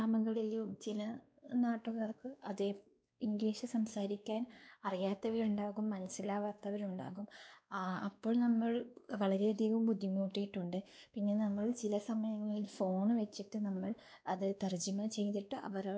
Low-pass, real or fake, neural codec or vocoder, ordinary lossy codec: none; fake; codec, 16 kHz, 2 kbps, X-Codec, WavLM features, trained on Multilingual LibriSpeech; none